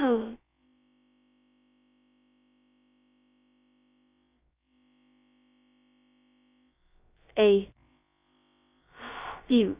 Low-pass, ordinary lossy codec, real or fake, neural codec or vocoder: 3.6 kHz; Opus, 64 kbps; fake; codec, 16 kHz, about 1 kbps, DyCAST, with the encoder's durations